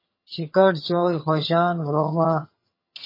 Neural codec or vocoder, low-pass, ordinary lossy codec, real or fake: vocoder, 22.05 kHz, 80 mel bands, HiFi-GAN; 5.4 kHz; MP3, 24 kbps; fake